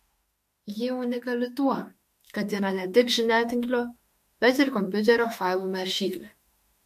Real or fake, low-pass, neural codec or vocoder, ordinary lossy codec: fake; 14.4 kHz; autoencoder, 48 kHz, 32 numbers a frame, DAC-VAE, trained on Japanese speech; MP3, 64 kbps